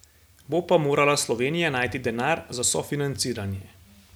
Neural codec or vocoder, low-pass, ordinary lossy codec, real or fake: none; none; none; real